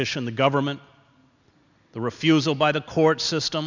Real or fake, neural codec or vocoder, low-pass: real; none; 7.2 kHz